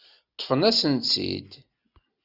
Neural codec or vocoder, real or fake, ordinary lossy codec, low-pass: none; real; Opus, 64 kbps; 5.4 kHz